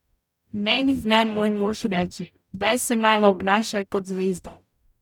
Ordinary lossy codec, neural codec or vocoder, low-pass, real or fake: none; codec, 44.1 kHz, 0.9 kbps, DAC; 19.8 kHz; fake